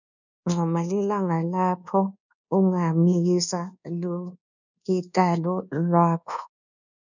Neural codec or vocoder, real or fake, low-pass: codec, 24 kHz, 1.2 kbps, DualCodec; fake; 7.2 kHz